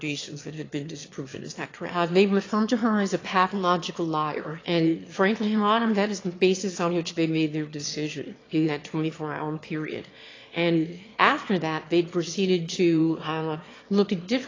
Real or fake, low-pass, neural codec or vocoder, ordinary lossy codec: fake; 7.2 kHz; autoencoder, 22.05 kHz, a latent of 192 numbers a frame, VITS, trained on one speaker; AAC, 32 kbps